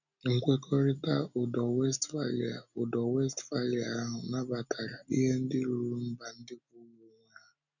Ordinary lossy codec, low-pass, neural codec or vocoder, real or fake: none; 7.2 kHz; none; real